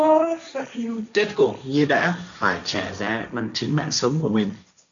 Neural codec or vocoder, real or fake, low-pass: codec, 16 kHz, 1.1 kbps, Voila-Tokenizer; fake; 7.2 kHz